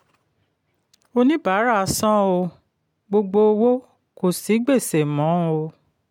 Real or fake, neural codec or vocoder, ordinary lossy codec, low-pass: real; none; MP3, 96 kbps; 19.8 kHz